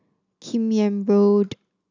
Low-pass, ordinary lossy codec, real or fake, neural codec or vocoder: 7.2 kHz; none; real; none